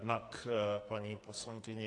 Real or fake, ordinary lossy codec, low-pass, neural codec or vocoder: fake; AAC, 48 kbps; 10.8 kHz; codec, 44.1 kHz, 2.6 kbps, SNAC